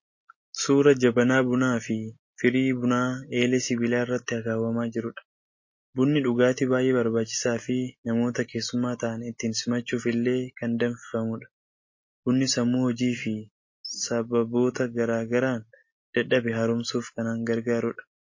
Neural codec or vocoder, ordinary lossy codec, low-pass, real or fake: none; MP3, 32 kbps; 7.2 kHz; real